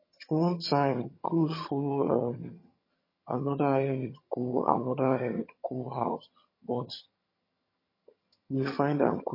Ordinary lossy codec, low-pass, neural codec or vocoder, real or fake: MP3, 24 kbps; 5.4 kHz; vocoder, 22.05 kHz, 80 mel bands, HiFi-GAN; fake